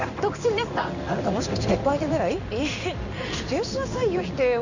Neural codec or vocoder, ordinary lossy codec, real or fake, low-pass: codec, 16 kHz in and 24 kHz out, 1 kbps, XY-Tokenizer; none; fake; 7.2 kHz